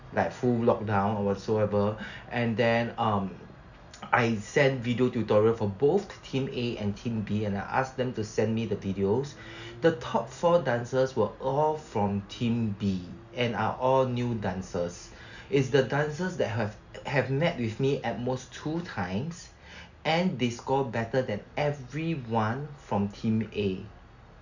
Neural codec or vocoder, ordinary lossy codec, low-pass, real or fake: none; none; 7.2 kHz; real